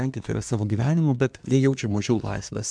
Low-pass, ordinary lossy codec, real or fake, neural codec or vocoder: 9.9 kHz; MP3, 96 kbps; fake; codec, 24 kHz, 1 kbps, SNAC